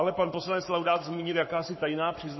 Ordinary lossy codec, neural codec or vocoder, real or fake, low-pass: MP3, 24 kbps; codec, 44.1 kHz, 7.8 kbps, Pupu-Codec; fake; 7.2 kHz